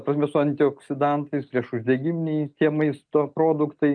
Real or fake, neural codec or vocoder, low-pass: real; none; 9.9 kHz